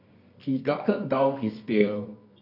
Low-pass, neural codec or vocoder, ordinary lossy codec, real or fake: 5.4 kHz; codec, 24 kHz, 0.9 kbps, WavTokenizer, medium music audio release; AAC, 32 kbps; fake